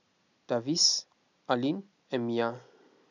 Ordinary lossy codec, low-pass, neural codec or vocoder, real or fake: none; 7.2 kHz; none; real